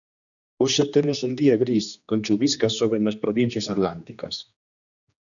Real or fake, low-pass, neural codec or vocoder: fake; 7.2 kHz; codec, 16 kHz, 2 kbps, X-Codec, HuBERT features, trained on general audio